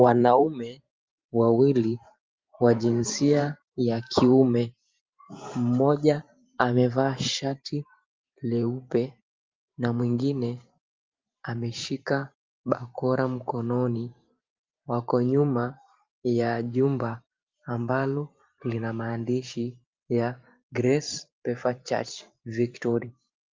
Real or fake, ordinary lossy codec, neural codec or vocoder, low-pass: fake; Opus, 32 kbps; vocoder, 44.1 kHz, 128 mel bands every 512 samples, BigVGAN v2; 7.2 kHz